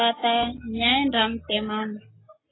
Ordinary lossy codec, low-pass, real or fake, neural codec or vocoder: AAC, 16 kbps; 7.2 kHz; real; none